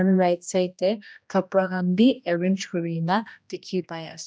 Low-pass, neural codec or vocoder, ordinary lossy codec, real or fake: none; codec, 16 kHz, 1 kbps, X-Codec, HuBERT features, trained on general audio; none; fake